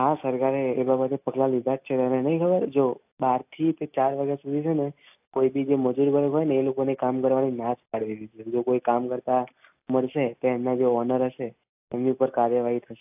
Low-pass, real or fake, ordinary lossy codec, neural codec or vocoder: 3.6 kHz; real; AAC, 32 kbps; none